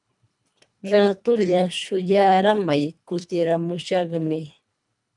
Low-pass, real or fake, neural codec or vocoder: 10.8 kHz; fake; codec, 24 kHz, 1.5 kbps, HILCodec